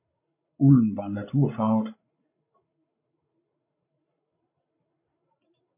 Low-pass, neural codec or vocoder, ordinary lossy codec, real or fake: 3.6 kHz; codec, 16 kHz, 16 kbps, FreqCodec, larger model; MP3, 24 kbps; fake